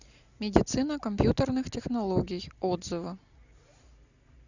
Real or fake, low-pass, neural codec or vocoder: real; 7.2 kHz; none